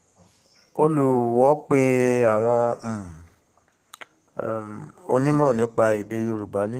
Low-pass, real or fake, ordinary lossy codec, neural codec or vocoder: 14.4 kHz; fake; Opus, 24 kbps; codec, 32 kHz, 1.9 kbps, SNAC